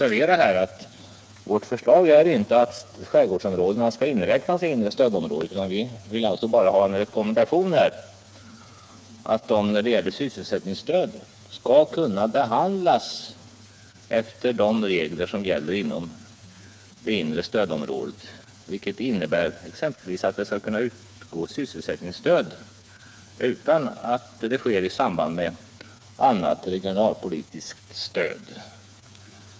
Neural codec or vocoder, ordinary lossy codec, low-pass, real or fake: codec, 16 kHz, 4 kbps, FreqCodec, smaller model; none; none; fake